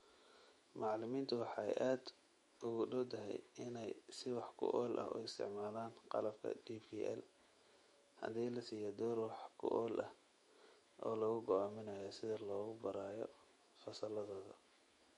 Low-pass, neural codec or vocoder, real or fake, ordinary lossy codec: 19.8 kHz; autoencoder, 48 kHz, 128 numbers a frame, DAC-VAE, trained on Japanese speech; fake; MP3, 48 kbps